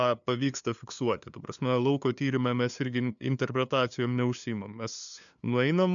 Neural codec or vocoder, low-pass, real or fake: codec, 16 kHz, 2 kbps, FunCodec, trained on LibriTTS, 25 frames a second; 7.2 kHz; fake